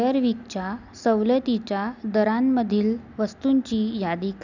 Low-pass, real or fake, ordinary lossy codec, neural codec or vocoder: 7.2 kHz; real; none; none